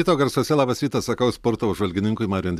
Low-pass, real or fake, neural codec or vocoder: 14.4 kHz; real; none